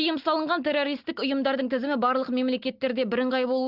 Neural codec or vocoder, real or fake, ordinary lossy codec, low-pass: none; real; Opus, 32 kbps; 5.4 kHz